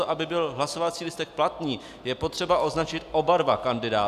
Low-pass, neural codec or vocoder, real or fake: 14.4 kHz; none; real